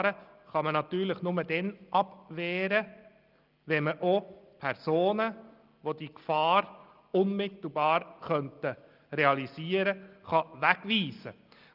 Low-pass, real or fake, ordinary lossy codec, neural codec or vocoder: 5.4 kHz; real; Opus, 32 kbps; none